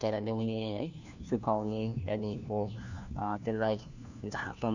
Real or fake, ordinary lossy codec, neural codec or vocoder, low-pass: fake; none; codec, 16 kHz, 1 kbps, FunCodec, trained on LibriTTS, 50 frames a second; 7.2 kHz